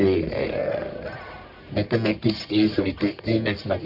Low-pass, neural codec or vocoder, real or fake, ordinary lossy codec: 5.4 kHz; codec, 44.1 kHz, 1.7 kbps, Pupu-Codec; fake; none